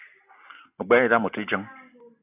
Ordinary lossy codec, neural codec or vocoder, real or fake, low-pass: AAC, 32 kbps; none; real; 3.6 kHz